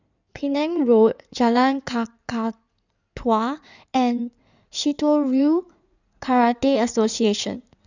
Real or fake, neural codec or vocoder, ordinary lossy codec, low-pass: fake; codec, 16 kHz in and 24 kHz out, 2.2 kbps, FireRedTTS-2 codec; none; 7.2 kHz